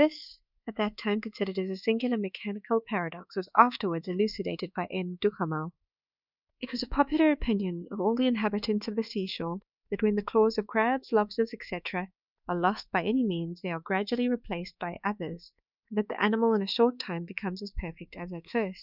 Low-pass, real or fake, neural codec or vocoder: 5.4 kHz; fake; codec, 24 kHz, 1.2 kbps, DualCodec